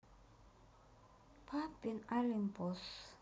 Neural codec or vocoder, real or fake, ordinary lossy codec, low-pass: none; real; none; none